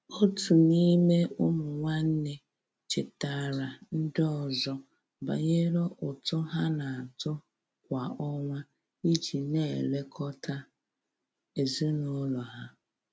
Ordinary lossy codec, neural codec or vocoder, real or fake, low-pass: none; none; real; none